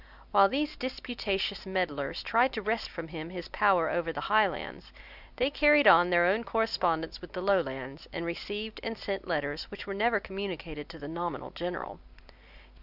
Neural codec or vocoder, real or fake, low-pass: none; real; 5.4 kHz